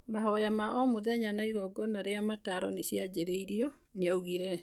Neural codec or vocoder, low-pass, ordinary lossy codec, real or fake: codec, 44.1 kHz, 7.8 kbps, DAC; 19.8 kHz; none; fake